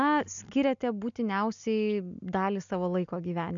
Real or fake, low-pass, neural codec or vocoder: real; 7.2 kHz; none